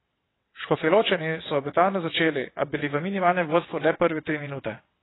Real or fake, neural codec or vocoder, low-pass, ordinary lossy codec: fake; vocoder, 22.05 kHz, 80 mel bands, WaveNeXt; 7.2 kHz; AAC, 16 kbps